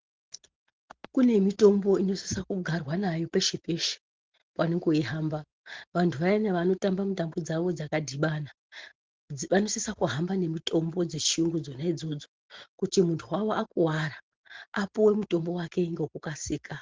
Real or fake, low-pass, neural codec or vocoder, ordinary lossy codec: real; 7.2 kHz; none; Opus, 16 kbps